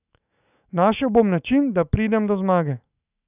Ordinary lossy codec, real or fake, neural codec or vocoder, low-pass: none; fake; autoencoder, 48 kHz, 128 numbers a frame, DAC-VAE, trained on Japanese speech; 3.6 kHz